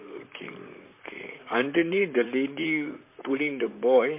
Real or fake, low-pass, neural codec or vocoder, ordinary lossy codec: fake; 3.6 kHz; vocoder, 44.1 kHz, 128 mel bands, Pupu-Vocoder; MP3, 24 kbps